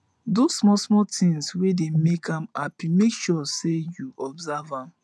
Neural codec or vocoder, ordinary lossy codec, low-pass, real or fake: vocoder, 24 kHz, 100 mel bands, Vocos; none; none; fake